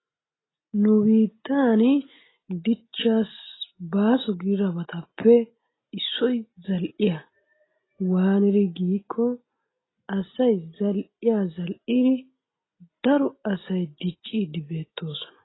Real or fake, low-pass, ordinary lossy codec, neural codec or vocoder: real; 7.2 kHz; AAC, 16 kbps; none